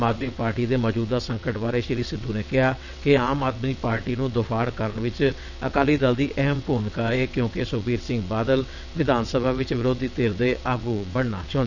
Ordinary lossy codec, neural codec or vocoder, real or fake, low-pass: none; vocoder, 22.05 kHz, 80 mel bands, WaveNeXt; fake; 7.2 kHz